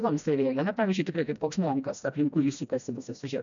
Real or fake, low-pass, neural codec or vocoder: fake; 7.2 kHz; codec, 16 kHz, 1 kbps, FreqCodec, smaller model